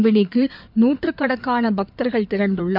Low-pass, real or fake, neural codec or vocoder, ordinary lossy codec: 5.4 kHz; fake; codec, 16 kHz in and 24 kHz out, 2.2 kbps, FireRedTTS-2 codec; none